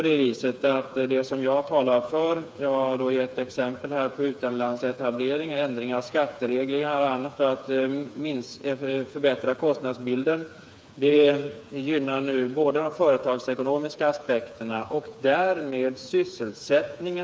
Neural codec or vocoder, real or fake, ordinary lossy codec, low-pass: codec, 16 kHz, 4 kbps, FreqCodec, smaller model; fake; none; none